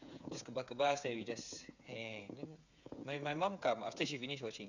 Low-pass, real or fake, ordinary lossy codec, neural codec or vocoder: 7.2 kHz; fake; none; codec, 16 kHz, 8 kbps, FreqCodec, smaller model